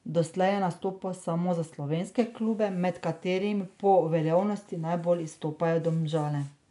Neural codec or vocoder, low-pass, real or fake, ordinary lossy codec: none; 10.8 kHz; real; none